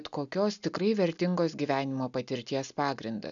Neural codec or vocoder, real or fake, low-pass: none; real; 7.2 kHz